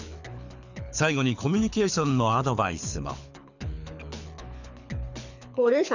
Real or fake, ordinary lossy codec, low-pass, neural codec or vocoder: fake; none; 7.2 kHz; codec, 24 kHz, 6 kbps, HILCodec